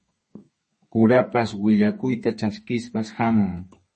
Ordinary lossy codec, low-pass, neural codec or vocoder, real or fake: MP3, 32 kbps; 10.8 kHz; codec, 32 kHz, 1.9 kbps, SNAC; fake